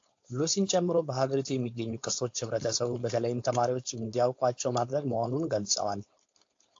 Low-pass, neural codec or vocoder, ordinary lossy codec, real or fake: 7.2 kHz; codec, 16 kHz, 4.8 kbps, FACodec; AAC, 48 kbps; fake